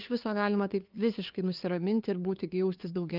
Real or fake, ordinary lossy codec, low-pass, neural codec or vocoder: fake; Opus, 32 kbps; 5.4 kHz; codec, 16 kHz, 2 kbps, FunCodec, trained on LibriTTS, 25 frames a second